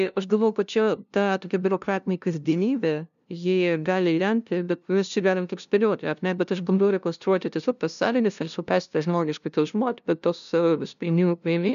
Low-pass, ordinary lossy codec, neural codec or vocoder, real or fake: 7.2 kHz; MP3, 96 kbps; codec, 16 kHz, 0.5 kbps, FunCodec, trained on LibriTTS, 25 frames a second; fake